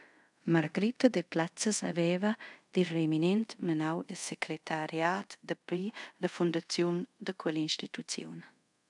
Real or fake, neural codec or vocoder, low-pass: fake; codec, 24 kHz, 0.5 kbps, DualCodec; 10.8 kHz